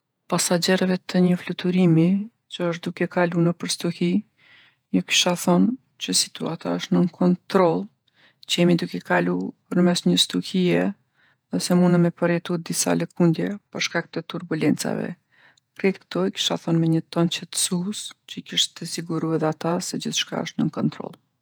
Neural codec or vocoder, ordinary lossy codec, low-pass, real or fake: vocoder, 48 kHz, 128 mel bands, Vocos; none; none; fake